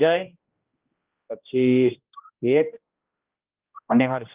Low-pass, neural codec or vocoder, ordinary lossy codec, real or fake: 3.6 kHz; codec, 16 kHz, 1 kbps, X-Codec, HuBERT features, trained on general audio; Opus, 24 kbps; fake